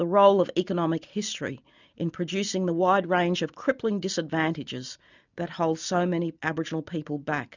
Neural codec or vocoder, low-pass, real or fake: none; 7.2 kHz; real